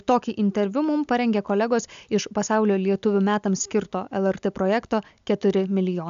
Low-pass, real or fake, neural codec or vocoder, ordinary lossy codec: 7.2 kHz; real; none; AAC, 96 kbps